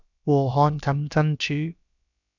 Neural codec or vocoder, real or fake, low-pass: codec, 16 kHz, about 1 kbps, DyCAST, with the encoder's durations; fake; 7.2 kHz